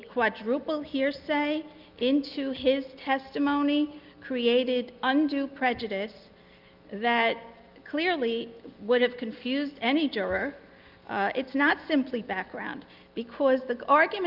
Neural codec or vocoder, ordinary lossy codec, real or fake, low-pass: none; Opus, 32 kbps; real; 5.4 kHz